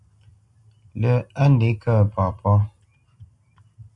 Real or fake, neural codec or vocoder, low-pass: real; none; 10.8 kHz